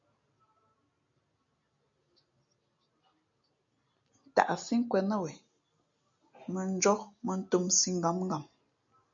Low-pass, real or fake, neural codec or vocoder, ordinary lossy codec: 7.2 kHz; real; none; MP3, 64 kbps